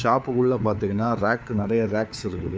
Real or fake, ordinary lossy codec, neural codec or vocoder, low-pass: fake; none; codec, 16 kHz, 4 kbps, FunCodec, trained on LibriTTS, 50 frames a second; none